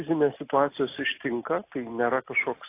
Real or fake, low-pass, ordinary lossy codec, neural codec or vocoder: real; 3.6 kHz; AAC, 24 kbps; none